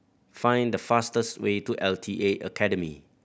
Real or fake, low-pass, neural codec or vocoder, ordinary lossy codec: real; none; none; none